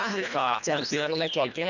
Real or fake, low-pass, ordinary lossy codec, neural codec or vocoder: fake; 7.2 kHz; none; codec, 24 kHz, 1.5 kbps, HILCodec